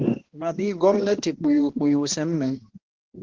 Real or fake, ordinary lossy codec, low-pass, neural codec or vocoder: fake; Opus, 16 kbps; 7.2 kHz; codec, 16 kHz, 1 kbps, X-Codec, HuBERT features, trained on balanced general audio